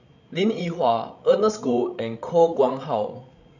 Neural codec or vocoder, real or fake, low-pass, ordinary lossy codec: codec, 16 kHz, 16 kbps, FreqCodec, larger model; fake; 7.2 kHz; none